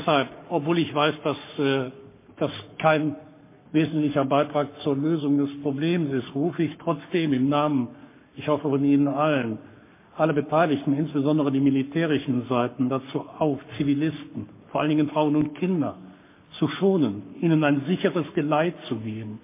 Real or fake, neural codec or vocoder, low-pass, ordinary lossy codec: fake; codec, 44.1 kHz, 7.8 kbps, Pupu-Codec; 3.6 kHz; MP3, 24 kbps